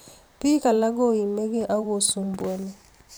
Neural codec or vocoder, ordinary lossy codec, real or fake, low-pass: vocoder, 44.1 kHz, 128 mel bands every 512 samples, BigVGAN v2; none; fake; none